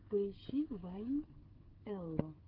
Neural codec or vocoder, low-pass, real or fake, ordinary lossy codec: codec, 16 kHz, 16 kbps, FreqCodec, smaller model; 5.4 kHz; fake; Opus, 16 kbps